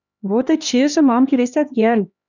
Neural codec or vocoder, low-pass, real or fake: codec, 16 kHz, 2 kbps, X-Codec, HuBERT features, trained on LibriSpeech; 7.2 kHz; fake